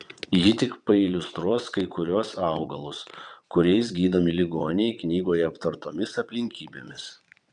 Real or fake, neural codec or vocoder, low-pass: fake; vocoder, 22.05 kHz, 80 mel bands, WaveNeXt; 9.9 kHz